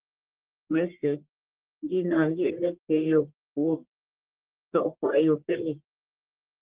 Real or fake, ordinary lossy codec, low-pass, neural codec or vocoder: fake; Opus, 16 kbps; 3.6 kHz; codec, 44.1 kHz, 1.7 kbps, Pupu-Codec